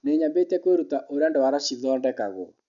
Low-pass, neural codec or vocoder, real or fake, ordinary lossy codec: 7.2 kHz; none; real; none